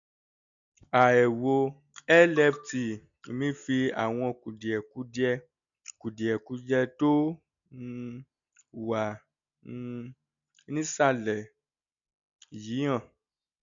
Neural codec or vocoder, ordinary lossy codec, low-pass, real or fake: none; none; 7.2 kHz; real